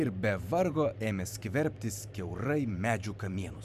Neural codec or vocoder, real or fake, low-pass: vocoder, 44.1 kHz, 128 mel bands every 512 samples, BigVGAN v2; fake; 14.4 kHz